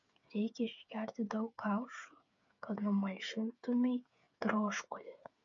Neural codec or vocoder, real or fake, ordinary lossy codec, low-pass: codec, 16 kHz, 8 kbps, FreqCodec, smaller model; fake; MP3, 48 kbps; 7.2 kHz